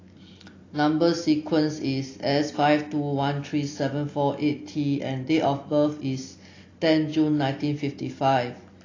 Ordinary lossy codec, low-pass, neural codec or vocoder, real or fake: AAC, 32 kbps; 7.2 kHz; none; real